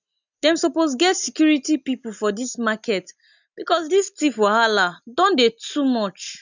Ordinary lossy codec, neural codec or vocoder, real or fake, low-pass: none; none; real; 7.2 kHz